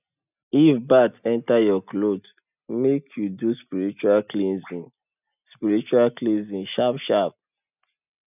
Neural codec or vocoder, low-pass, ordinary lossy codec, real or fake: none; 3.6 kHz; none; real